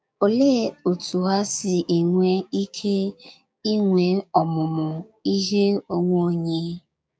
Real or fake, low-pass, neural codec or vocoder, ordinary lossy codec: fake; none; codec, 16 kHz, 6 kbps, DAC; none